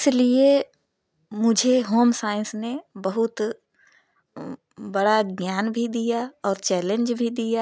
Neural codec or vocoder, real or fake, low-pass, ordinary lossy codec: none; real; none; none